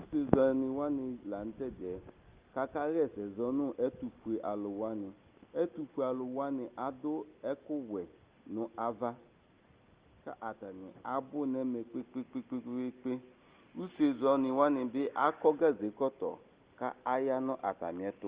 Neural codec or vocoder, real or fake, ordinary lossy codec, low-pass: none; real; Opus, 24 kbps; 3.6 kHz